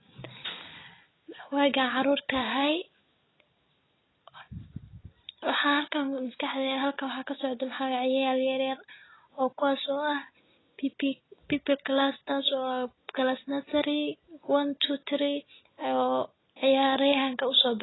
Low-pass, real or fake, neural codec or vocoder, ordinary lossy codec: 7.2 kHz; real; none; AAC, 16 kbps